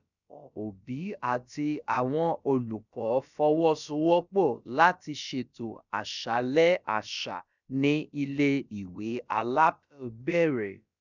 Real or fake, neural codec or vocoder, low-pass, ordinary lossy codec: fake; codec, 16 kHz, about 1 kbps, DyCAST, with the encoder's durations; 7.2 kHz; none